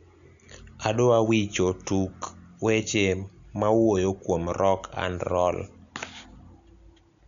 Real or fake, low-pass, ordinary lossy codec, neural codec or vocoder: real; 7.2 kHz; none; none